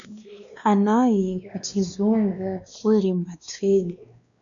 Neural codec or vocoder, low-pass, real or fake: codec, 16 kHz, 2 kbps, X-Codec, WavLM features, trained on Multilingual LibriSpeech; 7.2 kHz; fake